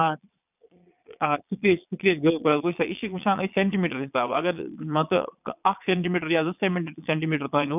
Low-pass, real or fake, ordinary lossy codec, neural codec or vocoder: 3.6 kHz; fake; none; vocoder, 22.05 kHz, 80 mel bands, Vocos